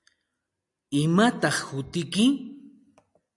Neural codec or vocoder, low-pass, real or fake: none; 10.8 kHz; real